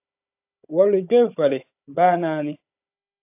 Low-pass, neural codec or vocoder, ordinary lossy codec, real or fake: 3.6 kHz; codec, 16 kHz, 16 kbps, FunCodec, trained on Chinese and English, 50 frames a second; AAC, 24 kbps; fake